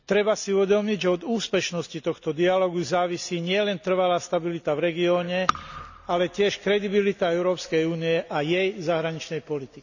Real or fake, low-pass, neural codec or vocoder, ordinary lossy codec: real; 7.2 kHz; none; none